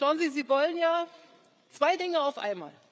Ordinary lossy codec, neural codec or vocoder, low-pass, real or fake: none; codec, 16 kHz, 8 kbps, FreqCodec, larger model; none; fake